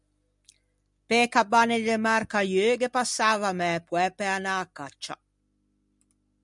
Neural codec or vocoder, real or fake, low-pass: none; real; 10.8 kHz